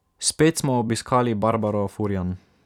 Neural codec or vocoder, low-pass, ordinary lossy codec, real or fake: vocoder, 44.1 kHz, 128 mel bands every 256 samples, BigVGAN v2; 19.8 kHz; none; fake